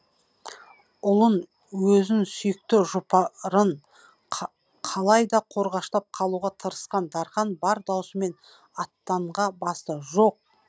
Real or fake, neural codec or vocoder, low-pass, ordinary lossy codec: real; none; none; none